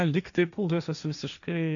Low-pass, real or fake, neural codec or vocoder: 7.2 kHz; fake; codec, 16 kHz, 1.1 kbps, Voila-Tokenizer